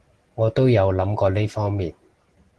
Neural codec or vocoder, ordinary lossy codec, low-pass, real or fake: none; Opus, 16 kbps; 10.8 kHz; real